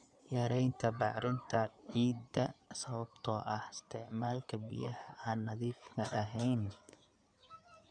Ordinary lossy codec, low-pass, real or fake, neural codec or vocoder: none; 9.9 kHz; fake; codec, 16 kHz in and 24 kHz out, 2.2 kbps, FireRedTTS-2 codec